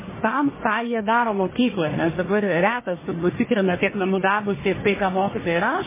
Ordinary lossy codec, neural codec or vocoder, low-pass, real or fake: MP3, 16 kbps; codec, 44.1 kHz, 1.7 kbps, Pupu-Codec; 3.6 kHz; fake